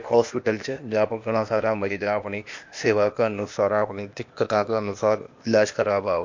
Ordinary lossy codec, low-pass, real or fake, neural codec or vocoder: MP3, 48 kbps; 7.2 kHz; fake; codec, 16 kHz, 0.8 kbps, ZipCodec